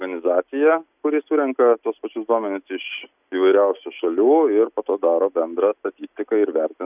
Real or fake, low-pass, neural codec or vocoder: real; 3.6 kHz; none